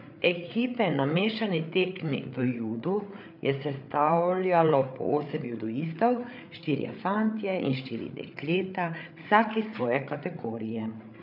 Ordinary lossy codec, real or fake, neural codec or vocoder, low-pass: none; fake; codec, 16 kHz, 8 kbps, FreqCodec, larger model; 5.4 kHz